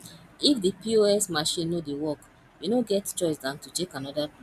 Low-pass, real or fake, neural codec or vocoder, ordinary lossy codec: 14.4 kHz; real; none; none